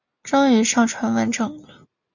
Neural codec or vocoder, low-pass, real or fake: none; 7.2 kHz; real